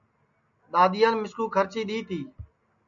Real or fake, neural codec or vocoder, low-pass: real; none; 7.2 kHz